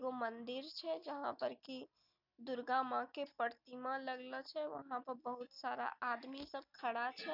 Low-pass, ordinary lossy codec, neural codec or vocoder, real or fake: 5.4 kHz; none; none; real